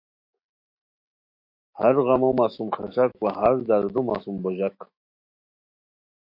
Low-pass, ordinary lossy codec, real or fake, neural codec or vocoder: 5.4 kHz; MP3, 32 kbps; fake; autoencoder, 48 kHz, 128 numbers a frame, DAC-VAE, trained on Japanese speech